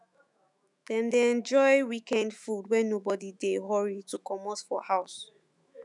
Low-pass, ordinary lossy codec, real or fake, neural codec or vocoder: 10.8 kHz; none; fake; autoencoder, 48 kHz, 128 numbers a frame, DAC-VAE, trained on Japanese speech